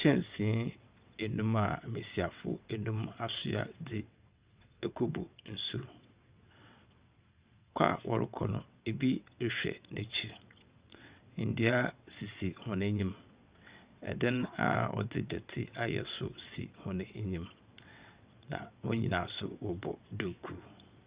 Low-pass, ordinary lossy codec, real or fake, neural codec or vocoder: 3.6 kHz; Opus, 64 kbps; fake; vocoder, 22.05 kHz, 80 mel bands, WaveNeXt